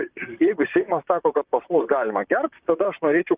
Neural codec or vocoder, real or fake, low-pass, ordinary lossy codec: vocoder, 44.1 kHz, 128 mel bands, Pupu-Vocoder; fake; 3.6 kHz; Opus, 32 kbps